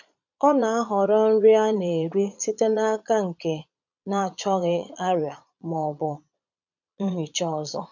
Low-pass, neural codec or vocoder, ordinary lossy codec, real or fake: 7.2 kHz; vocoder, 22.05 kHz, 80 mel bands, Vocos; none; fake